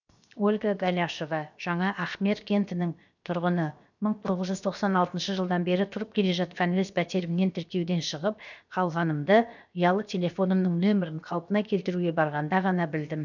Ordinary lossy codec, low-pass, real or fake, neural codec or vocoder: none; 7.2 kHz; fake; codec, 16 kHz, 0.7 kbps, FocalCodec